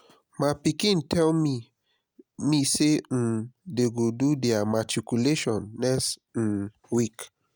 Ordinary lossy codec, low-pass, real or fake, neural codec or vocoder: none; none; real; none